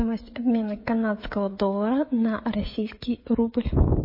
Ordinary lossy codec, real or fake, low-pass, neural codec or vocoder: MP3, 24 kbps; fake; 5.4 kHz; codec, 16 kHz, 16 kbps, FreqCodec, smaller model